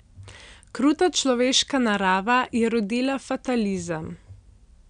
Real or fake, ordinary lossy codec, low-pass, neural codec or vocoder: real; none; 9.9 kHz; none